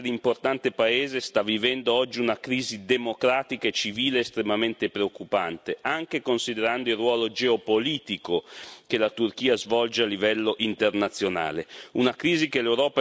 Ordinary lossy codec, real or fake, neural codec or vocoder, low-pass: none; real; none; none